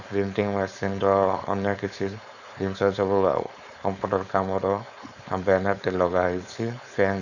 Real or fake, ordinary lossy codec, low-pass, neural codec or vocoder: fake; none; 7.2 kHz; codec, 16 kHz, 4.8 kbps, FACodec